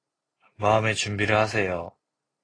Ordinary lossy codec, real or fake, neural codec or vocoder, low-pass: AAC, 32 kbps; real; none; 9.9 kHz